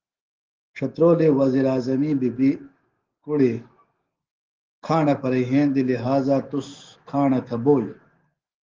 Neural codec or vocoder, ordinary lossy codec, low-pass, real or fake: autoencoder, 48 kHz, 128 numbers a frame, DAC-VAE, trained on Japanese speech; Opus, 16 kbps; 7.2 kHz; fake